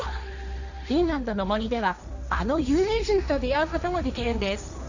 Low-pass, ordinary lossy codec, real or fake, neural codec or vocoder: 7.2 kHz; none; fake; codec, 16 kHz, 1.1 kbps, Voila-Tokenizer